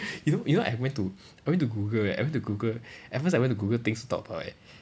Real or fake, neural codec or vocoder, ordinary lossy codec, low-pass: real; none; none; none